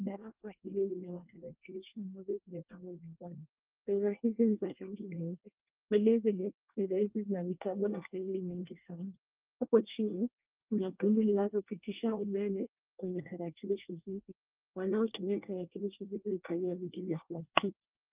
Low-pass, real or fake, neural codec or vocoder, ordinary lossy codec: 3.6 kHz; fake; codec, 24 kHz, 1 kbps, SNAC; Opus, 32 kbps